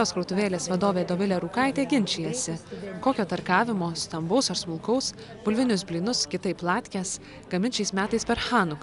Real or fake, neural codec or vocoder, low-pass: real; none; 10.8 kHz